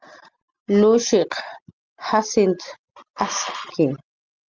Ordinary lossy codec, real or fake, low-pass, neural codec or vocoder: Opus, 32 kbps; real; 7.2 kHz; none